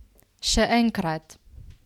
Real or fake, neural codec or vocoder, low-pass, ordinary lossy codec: real; none; 19.8 kHz; none